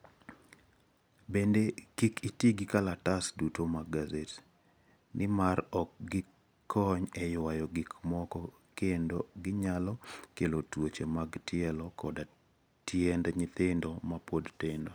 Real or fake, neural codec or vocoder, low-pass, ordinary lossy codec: real; none; none; none